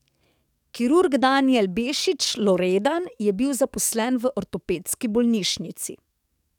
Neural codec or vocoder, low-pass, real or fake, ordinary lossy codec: codec, 44.1 kHz, 7.8 kbps, DAC; 19.8 kHz; fake; none